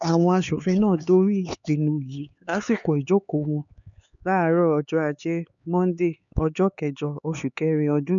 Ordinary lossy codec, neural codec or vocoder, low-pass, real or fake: none; codec, 16 kHz, 4 kbps, X-Codec, HuBERT features, trained on LibriSpeech; 7.2 kHz; fake